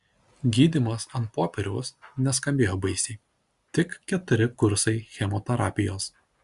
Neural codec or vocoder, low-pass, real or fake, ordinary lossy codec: none; 10.8 kHz; real; Opus, 64 kbps